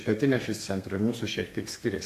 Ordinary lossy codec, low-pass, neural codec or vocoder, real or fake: AAC, 64 kbps; 14.4 kHz; codec, 32 kHz, 1.9 kbps, SNAC; fake